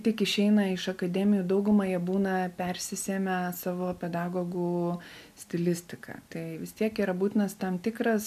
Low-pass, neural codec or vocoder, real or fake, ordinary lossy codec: 14.4 kHz; none; real; MP3, 96 kbps